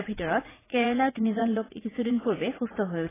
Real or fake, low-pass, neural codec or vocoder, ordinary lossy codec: fake; 3.6 kHz; vocoder, 44.1 kHz, 80 mel bands, Vocos; AAC, 16 kbps